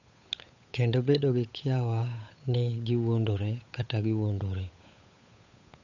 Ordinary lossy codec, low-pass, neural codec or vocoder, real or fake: none; 7.2 kHz; codec, 16 kHz, 8 kbps, FunCodec, trained on Chinese and English, 25 frames a second; fake